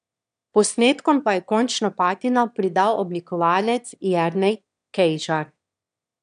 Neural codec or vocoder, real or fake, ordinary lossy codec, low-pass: autoencoder, 22.05 kHz, a latent of 192 numbers a frame, VITS, trained on one speaker; fake; none; 9.9 kHz